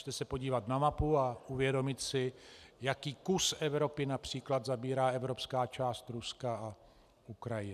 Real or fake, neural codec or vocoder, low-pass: real; none; 14.4 kHz